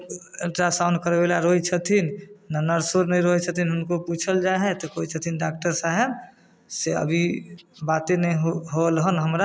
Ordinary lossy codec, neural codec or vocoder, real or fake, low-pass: none; none; real; none